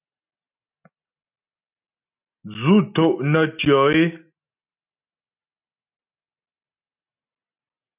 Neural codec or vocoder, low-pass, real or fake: none; 3.6 kHz; real